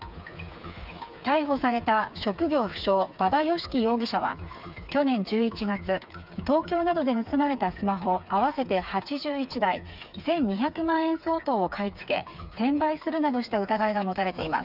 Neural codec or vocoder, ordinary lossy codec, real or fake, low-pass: codec, 16 kHz, 4 kbps, FreqCodec, smaller model; none; fake; 5.4 kHz